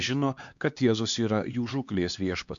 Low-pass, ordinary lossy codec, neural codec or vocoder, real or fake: 7.2 kHz; MP3, 64 kbps; codec, 16 kHz, 2 kbps, X-Codec, HuBERT features, trained on LibriSpeech; fake